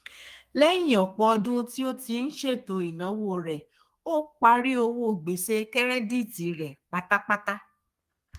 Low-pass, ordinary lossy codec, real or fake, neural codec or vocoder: 14.4 kHz; Opus, 32 kbps; fake; codec, 32 kHz, 1.9 kbps, SNAC